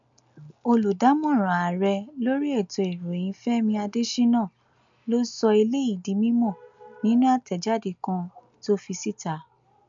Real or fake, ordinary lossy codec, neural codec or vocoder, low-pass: real; MP3, 64 kbps; none; 7.2 kHz